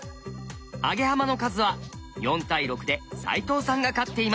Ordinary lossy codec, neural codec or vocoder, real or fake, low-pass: none; none; real; none